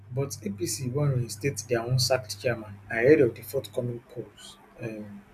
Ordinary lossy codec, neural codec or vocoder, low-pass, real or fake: none; none; 14.4 kHz; real